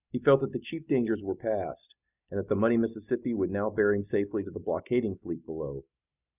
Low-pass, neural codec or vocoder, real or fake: 3.6 kHz; none; real